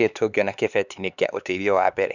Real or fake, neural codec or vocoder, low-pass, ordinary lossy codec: fake; codec, 16 kHz, 4 kbps, X-Codec, HuBERT features, trained on LibriSpeech; 7.2 kHz; none